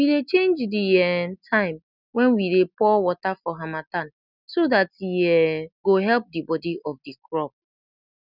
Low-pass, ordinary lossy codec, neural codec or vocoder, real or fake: 5.4 kHz; none; none; real